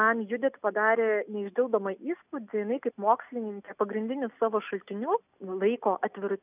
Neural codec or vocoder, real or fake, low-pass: none; real; 3.6 kHz